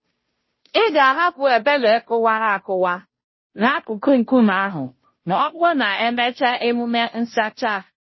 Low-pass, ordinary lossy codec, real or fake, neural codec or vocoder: 7.2 kHz; MP3, 24 kbps; fake; codec, 16 kHz, 0.5 kbps, FunCodec, trained on Chinese and English, 25 frames a second